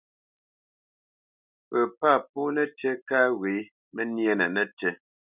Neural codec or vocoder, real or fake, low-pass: none; real; 3.6 kHz